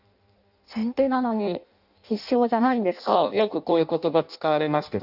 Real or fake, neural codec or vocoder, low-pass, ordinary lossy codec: fake; codec, 16 kHz in and 24 kHz out, 0.6 kbps, FireRedTTS-2 codec; 5.4 kHz; none